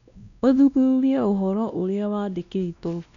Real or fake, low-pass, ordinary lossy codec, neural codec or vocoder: fake; 7.2 kHz; none; codec, 16 kHz, 1 kbps, X-Codec, WavLM features, trained on Multilingual LibriSpeech